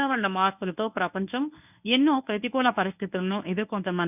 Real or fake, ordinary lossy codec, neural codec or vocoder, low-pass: fake; none; codec, 24 kHz, 0.9 kbps, WavTokenizer, medium speech release version 1; 3.6 kHz